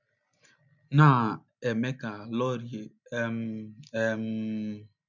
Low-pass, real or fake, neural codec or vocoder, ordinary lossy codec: 7.2 kHz; real; none; none